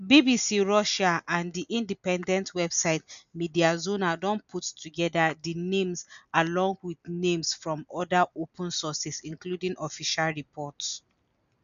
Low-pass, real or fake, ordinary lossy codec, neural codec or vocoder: 7.2 kHz; real; none; none